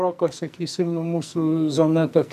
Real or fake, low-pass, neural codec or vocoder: fake; 14.4 kHz; codec, 44.1 kHz, 2.6 kbps, SNAC